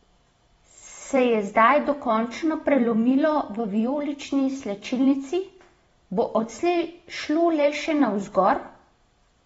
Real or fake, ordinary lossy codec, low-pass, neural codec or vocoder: fake; AAC, 24 kbps; 19.8 kHz; vocoder, 44.1 kHz, 128 mel bands every 512 samples, BigVGAN v2